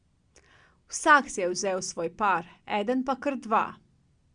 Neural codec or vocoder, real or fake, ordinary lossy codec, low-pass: none; real; Opus, 64 kbps; 9.9 kHz